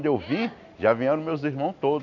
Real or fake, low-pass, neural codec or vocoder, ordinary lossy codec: real; 7.2 kHz; none; none